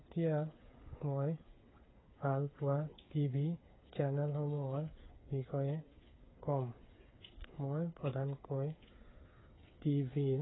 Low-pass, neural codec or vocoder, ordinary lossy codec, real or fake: 7.2 kHz; codec, 16 kHz, 8 kbps, FreqCodec, smaller model; AAC, 16 kbps; fake